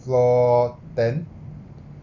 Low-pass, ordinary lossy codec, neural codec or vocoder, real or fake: 7.2 kHz; none; none; real